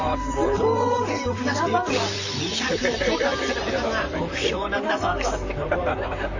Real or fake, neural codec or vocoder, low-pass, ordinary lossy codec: fake; vocoder, 44.1 kHz, 128 mel bands, Pupu-Vocoder; 7.2 kHz; AAC, 48 kbps